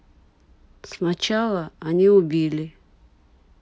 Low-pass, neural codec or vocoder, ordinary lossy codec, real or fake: none; none; none; real